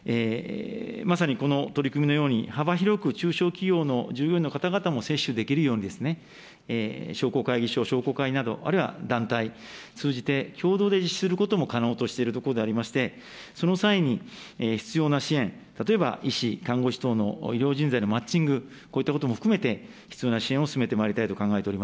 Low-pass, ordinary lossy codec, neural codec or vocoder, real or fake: none; none; none; real